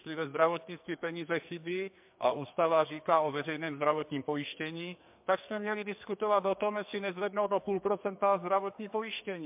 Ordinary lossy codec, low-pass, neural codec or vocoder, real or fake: MP3, 32 kbps; 3.6 kHz; codec, 44.1 kHz, 2.6 kbps, SNAC; fake